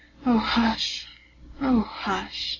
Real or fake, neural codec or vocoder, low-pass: real; none; 7.2 kHz